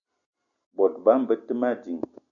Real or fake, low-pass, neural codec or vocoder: real; 7.2 kHz; none